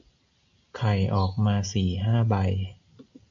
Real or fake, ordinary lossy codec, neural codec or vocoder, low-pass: real; AAC, 64 kbps; none; 7.2 kHz